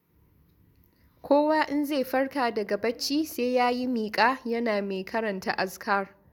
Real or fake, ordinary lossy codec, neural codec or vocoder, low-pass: real; none; none; 19.8 kHz